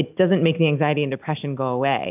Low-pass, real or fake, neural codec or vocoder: 3.6 kHz; real; none